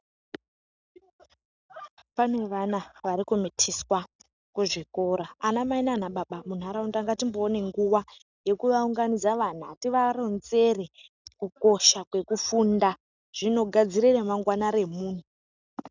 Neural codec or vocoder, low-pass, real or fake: none; 7.2 kHz; real